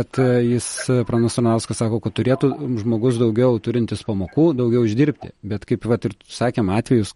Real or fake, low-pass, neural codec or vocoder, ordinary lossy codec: real; 19.8 kHz; none; MP3, 48 kbps